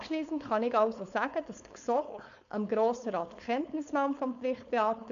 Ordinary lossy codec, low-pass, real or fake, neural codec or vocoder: AAC, 96 kbps; 7.2 kHz; fake; codec, 16 kHz, 4.8 kbps, FACodec